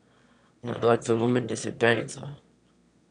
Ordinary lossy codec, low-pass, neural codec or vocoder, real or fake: none; 9.9 kHz; autoencoder, 22.05 kHz, a latent of 192 numbers a frame, VITS, trained on one speaker; fake